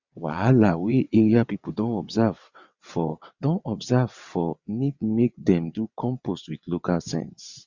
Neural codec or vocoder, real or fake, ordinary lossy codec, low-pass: vocoder, 22.05 kHz, 80 mel bands, WaveNeXt; fake; Opus, 64 kbps; 7.2 kHz